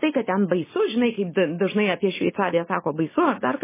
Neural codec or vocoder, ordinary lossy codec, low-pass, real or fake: none; MP3, 16 kbps; 3.6 kHz; real